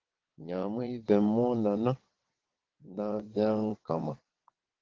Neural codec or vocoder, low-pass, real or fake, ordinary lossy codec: vocoder, 22.05 kHz, 80 mel bands, WaveNeXt; 7.2 kHz; fake; Opus, 16 kbps